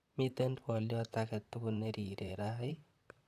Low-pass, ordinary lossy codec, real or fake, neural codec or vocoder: 14.4 kHz; none; fake; vocoder, 44.1 kHz, 128 mel bands, Pupu-Vocoder